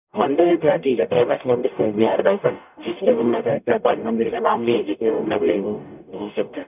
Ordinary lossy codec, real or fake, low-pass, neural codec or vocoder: none; fake; 3.6 kHz; codec, 44.1 kHz, 0.9 kbps, DAC